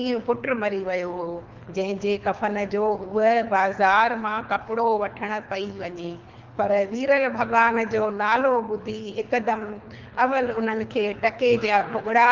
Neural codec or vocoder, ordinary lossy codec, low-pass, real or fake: codec, 24 kHz, 3 kbps, HILCodec; Opus, 24 kbps; 7.2 kHz; fake